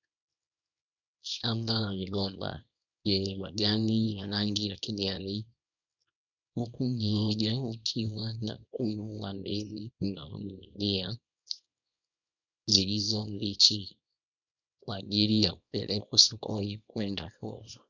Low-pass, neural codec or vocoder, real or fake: 7.2 kHz; codec, 24 kHz, 0.9 kbps, WavTokenizer, small release; fake